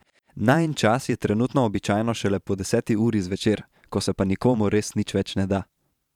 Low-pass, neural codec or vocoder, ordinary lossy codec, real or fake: 19.8 kHz; vocoder, 44.1 kHz, 128 mel bands every 512 samples, BigVGAN v2; none; fake